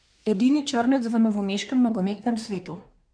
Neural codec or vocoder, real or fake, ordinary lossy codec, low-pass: codec, 24 kHz, 1 kbps, SNAC; fake; none; 9.9 kHz